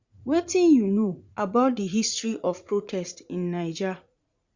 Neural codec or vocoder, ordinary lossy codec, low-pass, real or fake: vocoder, 44.1 kHz, 80 mel bands, Vocos; Opus, 64 kbps; 7.2 kHz; fake